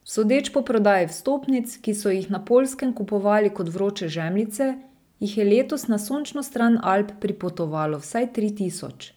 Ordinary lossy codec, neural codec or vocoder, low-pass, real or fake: none; none; none; real